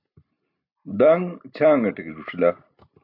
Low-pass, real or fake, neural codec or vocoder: 5.4 kHz; real; none